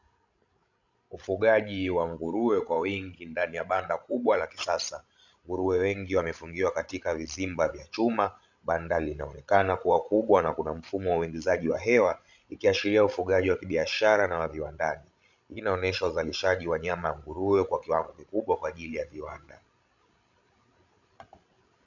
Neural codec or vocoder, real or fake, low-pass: codec, 16 kHz, 16 kbps, FreqCodec, larger model; fake; 7.2 kHz